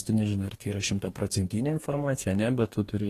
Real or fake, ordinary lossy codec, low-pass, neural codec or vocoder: fake; AAC, 48 kbps; 14.4 kHz; codec, 44.1 kHz, 2.6 kbps, DAC